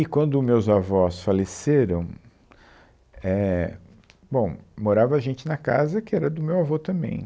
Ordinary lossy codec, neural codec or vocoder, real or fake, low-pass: none; none; real; none